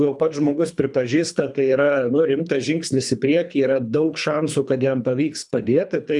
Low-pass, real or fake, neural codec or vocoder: 10.8 kHz; fake; codec, 24 kHz, 3 kbps, HILCodec